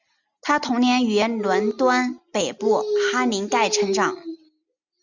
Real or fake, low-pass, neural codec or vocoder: real; 7.2 kHz; none